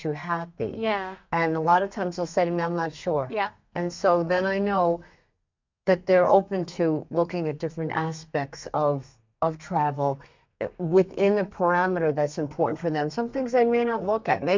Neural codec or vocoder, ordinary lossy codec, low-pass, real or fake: codec, 32 kHz, 1.9 kbps, SNAC; MP3, 64 kbps; 7.2 kHz; fake